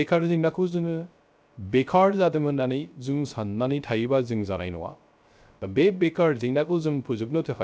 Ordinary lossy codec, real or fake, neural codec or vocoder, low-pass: none; fake; codec, 16 kHz, 0.3 kbps, FocalCodec; none